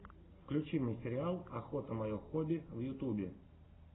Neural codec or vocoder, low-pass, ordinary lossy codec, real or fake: none; 7.2 kHz; AAC, 16 kbps; real